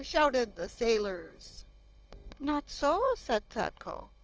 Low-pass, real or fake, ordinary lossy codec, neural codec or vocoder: 7.2 kHz; fake; Opus, 24 kbps; codec, 16 kHz, 16 kbps, FunCodec, trained on Chinese and English, 50 frames a second